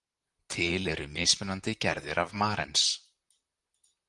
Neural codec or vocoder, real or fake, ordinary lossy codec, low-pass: vocoder, 44.1 kHz, 128 mel bands, Pupu-Vocoder; fake; Opus, 32 kbps; 10.8 kHz